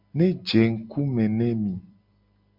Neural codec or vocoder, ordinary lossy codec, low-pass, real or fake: none; MP3, 48 kbps; 5.4 kHz; real